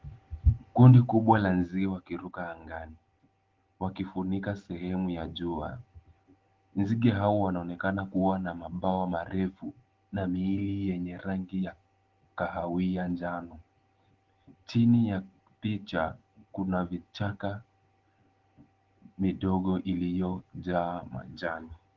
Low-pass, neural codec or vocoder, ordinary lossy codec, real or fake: 7.2 kHz; none; Opus, 16 kbps; real